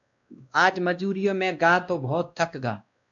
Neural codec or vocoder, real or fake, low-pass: codec, 16 kHz, 1 kbps, X-Codec, WavLM features, trained on Multilingual LibriSpeech; fake; 7.2 kHz